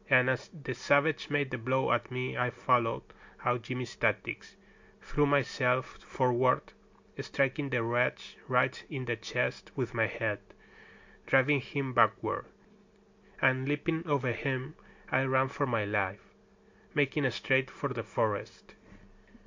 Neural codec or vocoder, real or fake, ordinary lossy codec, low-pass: none; real; MP3, 48 kbps; 7.2 kHz